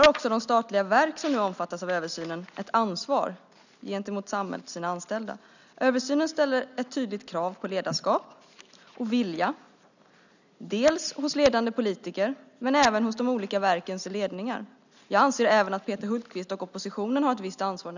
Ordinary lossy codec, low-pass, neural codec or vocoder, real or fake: none; 7.2 kHz; none; real